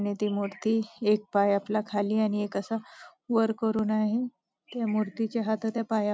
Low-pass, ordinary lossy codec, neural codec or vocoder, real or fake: none; none; none; real